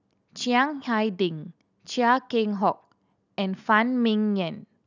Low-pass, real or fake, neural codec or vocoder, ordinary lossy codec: 7.2 kHz; real; none; none